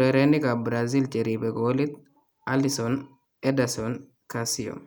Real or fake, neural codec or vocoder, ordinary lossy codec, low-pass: real; none; none; none